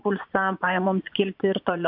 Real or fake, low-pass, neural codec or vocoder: fake; 5.4 kHz; vocoder, 24 kHz, 100 mel bands, Vocos